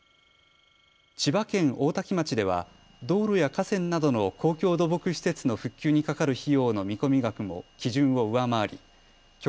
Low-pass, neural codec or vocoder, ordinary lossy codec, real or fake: none; none; none; real